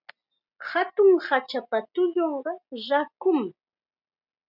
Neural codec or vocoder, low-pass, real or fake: none; 5.4 kHz; real